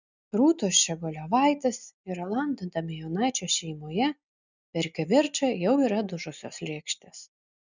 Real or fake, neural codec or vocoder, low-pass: real; none; 7.2 kHz